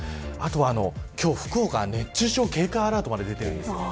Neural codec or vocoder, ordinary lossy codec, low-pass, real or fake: none; none; none; real